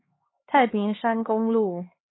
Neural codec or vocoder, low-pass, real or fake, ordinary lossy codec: codec, 16 kHz, 2 kbps, X-Codec, HuBERT features, trained on LibriSpeech; 7.2 kHz; fake; AAC, 16 kbps